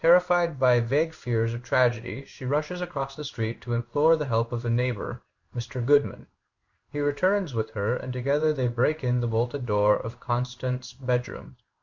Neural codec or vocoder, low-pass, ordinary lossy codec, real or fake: codec, 16 kHz in and 24 kHz out, 1 kbps, XY-Tokenizer; 7.2 kHz; Opus, 64 kbps; fake